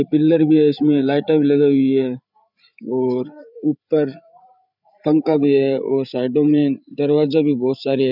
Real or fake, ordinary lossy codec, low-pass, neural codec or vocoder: fake; none; 5.4 kHz; codec, 16 kHz, 8 kbps, FreqCodec, larger model